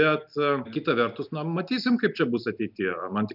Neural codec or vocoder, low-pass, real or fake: none; 5.4 kHz; real